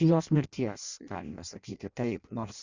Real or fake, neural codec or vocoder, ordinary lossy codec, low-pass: fake; codec, 16 kHz in and 24 kHz out, 0.6 kbps, FireRedTTS-2 codec; Opus, 64 kbps; 7.2 kHz